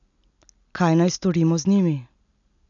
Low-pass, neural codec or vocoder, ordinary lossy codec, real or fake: 7.2 kHz; none; none; real